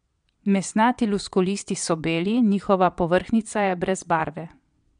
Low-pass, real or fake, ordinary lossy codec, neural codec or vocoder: 9.9 kHz; fake; MP3, 64 kbps; vocoder, 22.05 kHz, 80 mel bands, Vocos